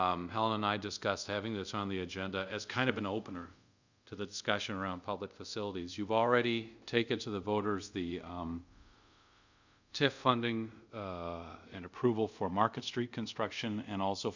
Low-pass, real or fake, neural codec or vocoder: 7.2 kHz; fake; codec, 24 kHz, 0.5 kbps, DualCodec